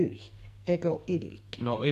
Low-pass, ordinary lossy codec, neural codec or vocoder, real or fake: 14.4 kHz; MP3, 96 kbps; codec, 44.1 kHz, 2.6 kbps, SNAC; fake